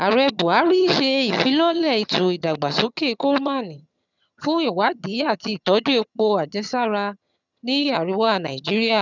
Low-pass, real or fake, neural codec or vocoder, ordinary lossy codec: 7.2 kHz; fake; vocoder, 22.05 kHz, 80 mel bands, HiFi-GAN; none